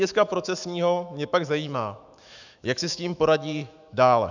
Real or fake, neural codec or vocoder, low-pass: fake; autoencoder, 48 kHz, 128 numbers a frame, DAC-VAE, trained on Japanese speech; 7.2 kHz